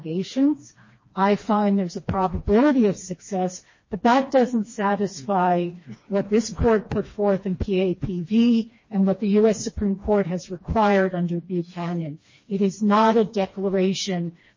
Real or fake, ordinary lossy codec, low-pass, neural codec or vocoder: fake; MP3, 32 kbps; 7.2 kHz; codec, 16 kHz, 2 kbps, FreqCodec, smaller model